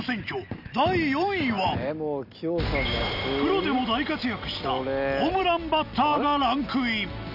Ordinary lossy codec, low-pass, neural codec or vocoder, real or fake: none; 5.4 kHz; none; real